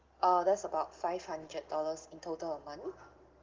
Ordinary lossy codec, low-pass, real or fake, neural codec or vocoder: Opus, 16 kbps; 7.2 kHz; real; none